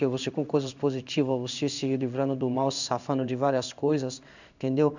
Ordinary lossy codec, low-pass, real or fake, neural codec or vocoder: none; 7.2 kHz; fake; codec, 16 kHz in and 24 kHz out, 1 kbps, XY-Tokenizer